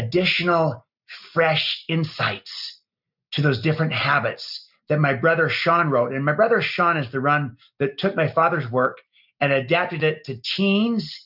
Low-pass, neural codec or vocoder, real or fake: 5.4 kHz; none; real